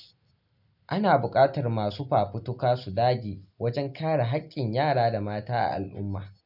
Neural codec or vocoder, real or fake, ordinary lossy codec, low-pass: none; real; none; 5.4 kHz